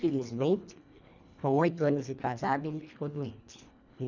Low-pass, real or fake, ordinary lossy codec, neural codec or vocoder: 7.2 kHz; fake; none; codec, 24 kHz, 1.5 kbps, HILCodec